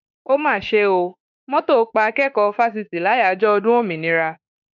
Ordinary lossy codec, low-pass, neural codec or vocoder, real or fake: none; 7.2 kHz; autoencoder, 48 kHz, 32 numbers a frame, DAC-VAE, trained on Japanese speech; fake